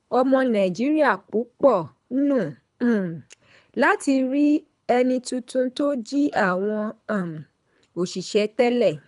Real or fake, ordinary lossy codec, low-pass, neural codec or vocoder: fake; none; 10.8 kHz; codec, 24 kHz, 3 kbps, HILCodec